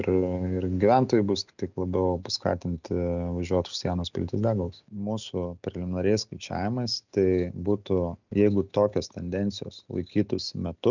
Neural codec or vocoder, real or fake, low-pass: none; real; 7.2 kHz